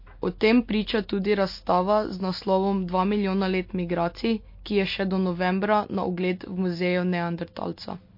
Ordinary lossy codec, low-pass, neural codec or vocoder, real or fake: MP3, 32 kbps; 5.4 kHz; none; real